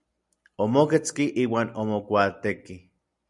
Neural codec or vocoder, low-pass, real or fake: none; 10.8 kHz; real